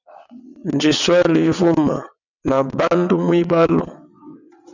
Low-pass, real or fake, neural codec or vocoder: 7.2 kHz; fake; vocoder, 22.05 kHz, 80 mel bands, WaveNeXt